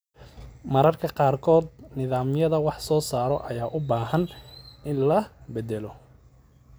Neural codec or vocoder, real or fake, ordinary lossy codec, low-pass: none; real; none; none